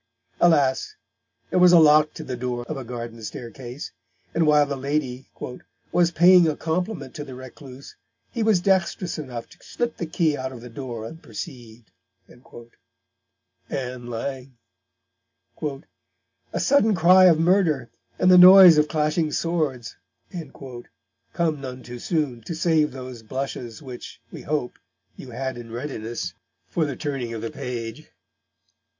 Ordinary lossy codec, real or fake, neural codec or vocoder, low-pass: MP3, 48 kbps; real; none; 7.2 kHz